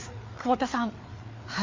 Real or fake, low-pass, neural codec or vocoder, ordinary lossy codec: fake; 7.2 kHz; codec, 16 kHz, 4 kbps, FreqCodec, larger model; MP3, 48 kbps